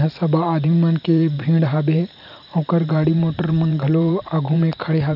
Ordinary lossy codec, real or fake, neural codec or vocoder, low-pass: none; fake; vocoder, 44.1 kHz, 128 mel bands every 512 samples, BigVGAN v2; 5.4 kHz